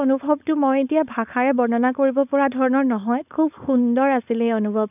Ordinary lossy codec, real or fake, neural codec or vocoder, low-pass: none; fake; codec, 16 kHz, 4.8 kbps, FACodec; 3.6 kHz